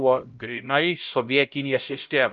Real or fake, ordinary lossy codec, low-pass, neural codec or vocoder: fake; Opus, 32 kbps; 7.2 kHz; codec, 16 kHz, 0.5 kbps, X-Codec, HuBERT features, trained on LibriSpeech